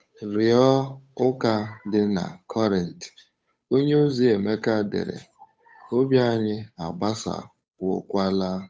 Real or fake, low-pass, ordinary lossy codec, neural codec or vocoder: fake; none; none; codec, 16 kHz, 8 kbps, FunCodec, trained on Chinese and English, 25 frames a second